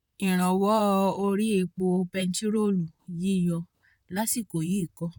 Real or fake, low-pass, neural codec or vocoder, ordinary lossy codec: fake; 19.8 kHz; vocoder, 44.1 kHz, 128 mel bands, Pupu-Vocoder; none